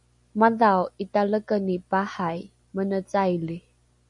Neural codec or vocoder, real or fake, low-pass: none; real; 10.8 kHz